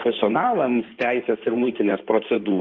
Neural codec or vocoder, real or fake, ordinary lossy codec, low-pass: codec, 16 kHz in and 24 kHz out, 2.2 kbps, FireRedTTS-2 codec; fake; Opus, 32 kbps; 7.2 kHz